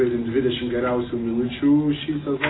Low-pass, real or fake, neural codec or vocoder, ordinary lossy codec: 7.2 kHz; real; none; AAC, 16 kbps